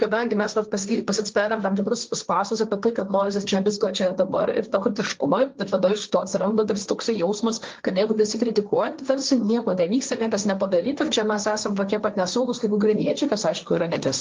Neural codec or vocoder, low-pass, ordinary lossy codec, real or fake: codec, 16 kHz, 1.1 kbps, Voila-Tokenizer; 7.2 kHz; Opus, 24 kbps; fake